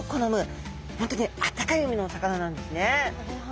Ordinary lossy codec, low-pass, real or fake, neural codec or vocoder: none; none; real; none